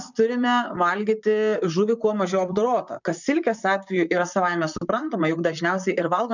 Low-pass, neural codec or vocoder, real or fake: 7.2 kHz; vocoder, 44.1 kHz, 128 mel bands, Pupu-Vocoder; fake